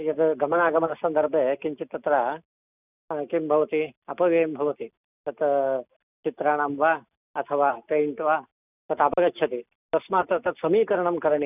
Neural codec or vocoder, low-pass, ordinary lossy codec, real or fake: none; 3.6 kHz; none; real